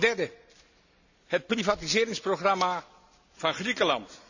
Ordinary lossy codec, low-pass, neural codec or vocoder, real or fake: none; 7.2 kHz; none; real